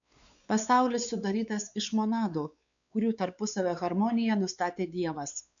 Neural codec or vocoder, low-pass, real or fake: codec, 16 kHz, 4 kbps, X-Codec, WavLM features, trained on Multilingual LibriSpeech; 7.2 kHz; fake